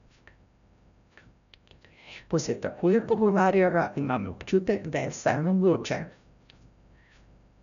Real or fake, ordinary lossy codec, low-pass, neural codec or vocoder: fake; none; 7.2 kHz; codec, 16 kHz, 0.5 kbps, FreqCodec, larger model